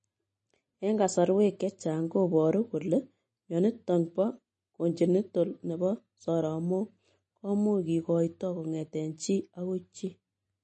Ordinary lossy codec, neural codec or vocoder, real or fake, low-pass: MP3, 32 kbps; none; real; 10.8 kHz